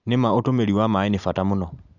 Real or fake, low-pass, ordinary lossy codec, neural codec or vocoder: real; 7.2 kHz; none; none